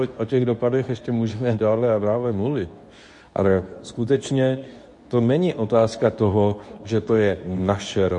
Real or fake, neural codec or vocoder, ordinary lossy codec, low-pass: fake; codec, 24 kHz, 0.9 kbps, WavTokenizer, medium speech release version 2; MP3, 48 kbps; 10.8 kHz